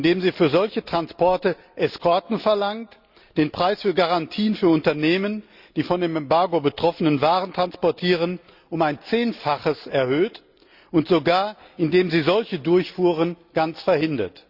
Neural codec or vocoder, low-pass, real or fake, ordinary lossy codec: none; 5.4 kHz; real; Opus, 64 kbps